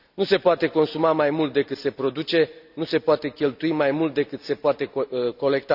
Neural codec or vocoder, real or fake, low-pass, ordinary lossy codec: none; real; 5.4 kHz; none